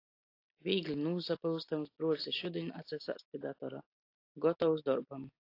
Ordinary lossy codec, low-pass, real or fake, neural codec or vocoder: AAC, 32 kbps; 5.4 kHz; real; none